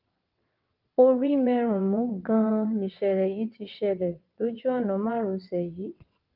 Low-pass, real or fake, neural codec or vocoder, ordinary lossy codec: 5.4 kHz; fake; vocoder, 22.05 kHz, 80 mel bands, WaveNeXt; Opus, 32 kbps